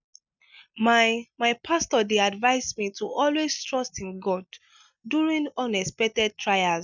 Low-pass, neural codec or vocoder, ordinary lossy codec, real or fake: 7.2 kHz; none; none; real